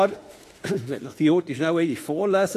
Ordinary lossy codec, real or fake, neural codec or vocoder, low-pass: AAC, 48 kbps; fake; autoencoder, 48 kHz, 32 numbers a frame, DAC-VAE, trained on Japanese speech; 14.4 kHz